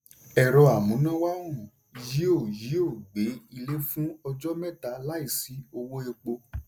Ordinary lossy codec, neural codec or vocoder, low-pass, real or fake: none; none; none; real